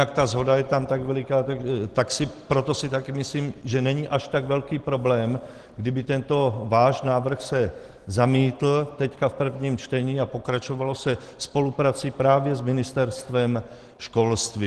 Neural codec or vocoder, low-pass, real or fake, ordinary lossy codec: none; 10.8 kHz; real; Opus, 16 kbps